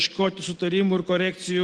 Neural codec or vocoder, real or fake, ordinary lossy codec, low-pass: none; real; Opus, 16 kbps; 10.8 kHz